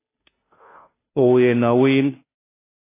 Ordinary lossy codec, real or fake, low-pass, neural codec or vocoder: AAC, 16 kbps; fake; 3.6 kHz; codec, 16 kHz, 0.5 kbps, FunCodec, trained on Chinese and English, 25 frames a second